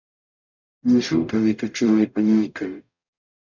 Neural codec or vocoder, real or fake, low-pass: codec, 44.1 kHz, 0.9 kbps, DAC; fake; 7.2 kHz